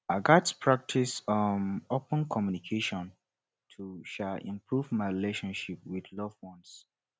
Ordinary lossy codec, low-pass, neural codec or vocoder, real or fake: none; none; none; real